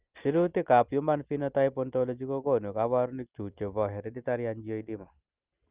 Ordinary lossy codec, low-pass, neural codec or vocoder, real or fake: Opus, 32 kbps; 3.6 kHz; none; real